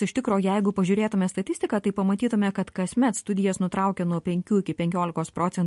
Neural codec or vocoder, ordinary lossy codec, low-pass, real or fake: none; MP3, 48 kbps; 14.4 kHz; real